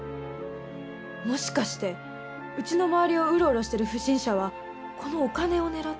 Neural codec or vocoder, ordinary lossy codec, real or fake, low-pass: none; none; real; none